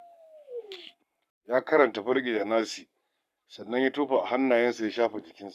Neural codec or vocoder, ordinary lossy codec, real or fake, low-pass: codec, 44.1 kHz, 7.8 kbps, Pupu-Codec; none; fake; 14.4 kHz